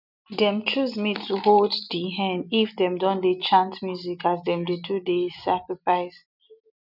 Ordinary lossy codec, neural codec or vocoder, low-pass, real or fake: none; none; 5.4 kHz; real